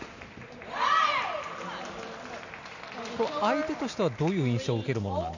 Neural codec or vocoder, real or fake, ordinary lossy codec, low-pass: none; real; none; 7.2 kHz